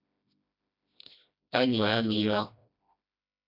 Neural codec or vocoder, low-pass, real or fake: codec, 16 kHz, 1 kbps, FreqCodec, smaller model; 5.4 kHz; fake